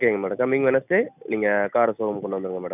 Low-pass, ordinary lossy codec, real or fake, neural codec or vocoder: 3.6 kHz; none; real; none